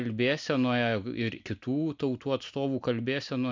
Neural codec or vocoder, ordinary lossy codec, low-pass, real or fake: none; MP3, 64 kbps; 7.2 kHz; real